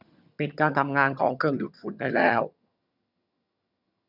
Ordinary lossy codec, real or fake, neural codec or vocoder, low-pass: none; fake; vocoder, 22.05 kHz, 80 mel bands, HiFi-GAN; 5.4 kHz